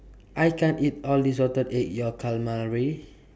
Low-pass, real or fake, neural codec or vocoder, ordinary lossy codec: none; real; none; none